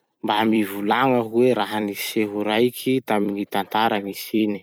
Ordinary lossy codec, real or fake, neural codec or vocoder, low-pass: none; fake; vocoder, 44.1 kHz, 128 mel bands every 256 samples, BigVGAN v2; none